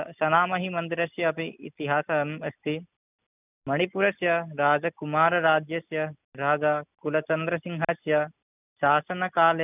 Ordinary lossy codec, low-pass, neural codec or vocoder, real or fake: none; 3.6 kHz; none; real